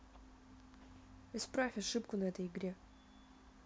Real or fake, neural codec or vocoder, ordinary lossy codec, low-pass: real; none; none; none